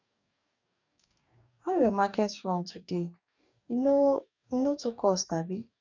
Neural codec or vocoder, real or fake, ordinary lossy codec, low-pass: codec, 44.1 kHz, 2.6 kbps, DAC; fake; none; 7.2 kHz